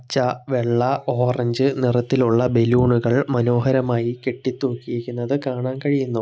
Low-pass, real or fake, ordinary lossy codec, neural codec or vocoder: none; real; none; none